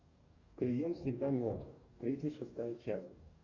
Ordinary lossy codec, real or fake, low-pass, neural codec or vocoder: Opus, 64 kbps; fake; 7.2 kHz; codec, 44.1 kHz, 2.6 kbps, DAC